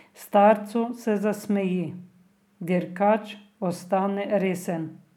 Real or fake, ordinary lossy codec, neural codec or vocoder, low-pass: real; none; none; 19.8 kHz